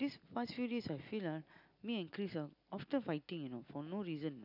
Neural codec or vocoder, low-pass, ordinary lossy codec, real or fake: none; 5.4 kHz; none; real